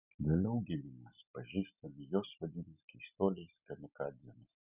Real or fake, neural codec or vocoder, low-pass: real; none; 3.6 kHz